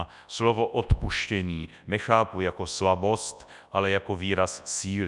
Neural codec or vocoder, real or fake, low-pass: codec, 24 kHz, 0.9 kbps, WavTokenizer, large speech release; fake; 10.8 kHz